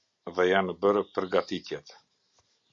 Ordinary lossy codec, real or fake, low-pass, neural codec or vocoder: MP3, 48 kbps; real; 7.2 kHz; none